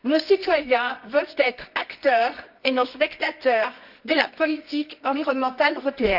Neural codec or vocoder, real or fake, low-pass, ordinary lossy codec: codec, 24 kHz, 0.9 kbps, WavTokenizer, medium music audio release; fake; 5.4 kHz; none